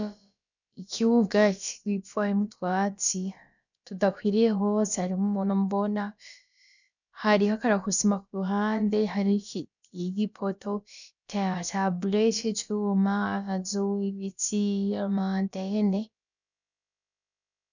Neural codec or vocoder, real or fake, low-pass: codec, 16 kHz, about 1 kbps, DyCAST, with the encoder's durations; fake; 7.2 kHz